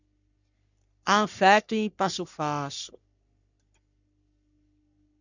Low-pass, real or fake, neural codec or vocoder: 7.2 kHz; fake; codec, 44.1 kHz, 3.4 kbps, Pupu-Codec